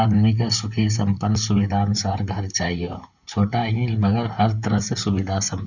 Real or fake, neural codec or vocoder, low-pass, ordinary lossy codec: fake; vocoder, 44.1 kHz, 128 mel bands, Pupu-Vocoder; 7.2 kHz; none